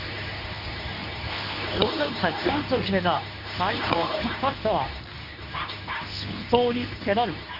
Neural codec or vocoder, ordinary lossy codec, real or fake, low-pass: codec, 24 kHz, 0.9 kbps, WavTokenizer, medium speech release version 2; none; fake; 5.4 kHz